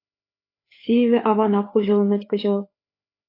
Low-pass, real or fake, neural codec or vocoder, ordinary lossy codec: 5.4 kHz; fake; codec, 16 kHz, 4 kbps, FreqCodec, larger model; AAC, 32 kbps